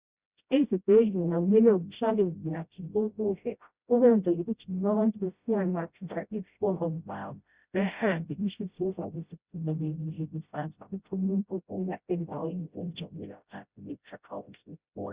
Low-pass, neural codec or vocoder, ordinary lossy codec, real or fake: 3.6 kHz; codec, 16 kHz, 0.5 kbps, FreqCodec, smaller model; Opus, 64 kbps; fake